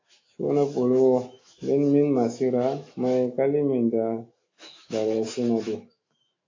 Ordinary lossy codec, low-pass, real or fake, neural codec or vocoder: AAC, 32 kbps; 7.2 kHz; fake; autoencoder, 48 kHz, 128 numbers a frame, DAC-VAE, trained on Japanese speech